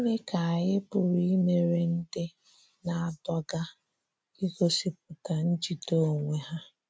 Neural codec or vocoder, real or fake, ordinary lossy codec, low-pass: none; real; none; none